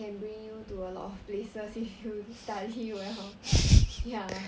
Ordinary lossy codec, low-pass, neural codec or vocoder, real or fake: none; none; none; real